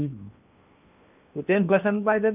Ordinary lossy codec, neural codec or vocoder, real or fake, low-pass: none; codec, 16 kHz in and 24 kHz out, 0.8 kbps, FocalCodec, streaming, 65536 codes; fake; 3.6 kHz